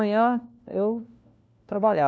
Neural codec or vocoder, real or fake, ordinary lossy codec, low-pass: codec, 16 kHz, 1 kbps, FunCodec, trained on LibriTTS, 50 frames a second; fake; none; none